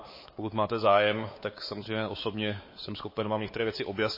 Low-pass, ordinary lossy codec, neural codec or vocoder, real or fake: 5.4 kHz; MP3, 24 kbps; codec, 16 kHz, 4 kbps, X-Codec, WavLM features, trained on Multilingual LibriSpeech; fake